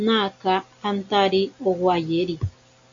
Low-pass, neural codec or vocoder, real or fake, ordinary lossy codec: 7.2 kHz; none; real; AAC, 48 kbps